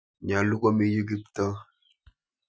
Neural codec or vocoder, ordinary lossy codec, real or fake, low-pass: none; none; real; none